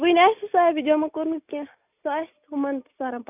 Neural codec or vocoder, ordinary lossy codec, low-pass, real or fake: none; none; 3.6 kHz; real